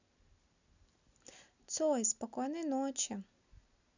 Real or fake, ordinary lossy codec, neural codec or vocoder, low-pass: real; none; none; 7.2 kHz